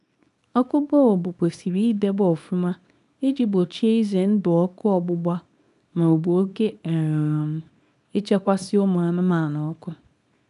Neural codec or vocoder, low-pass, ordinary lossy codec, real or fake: codec, 24 kHz, 0.9 kbps, WavTokenizer, small release; 10.8 kHz; none; fake